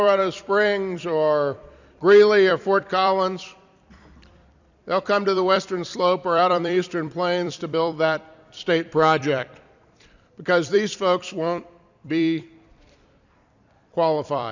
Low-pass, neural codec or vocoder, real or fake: 7.2 kHz; vocoder, 44.1 kHz, 128 mel bands every 256 samples, BigVGAN v2; fake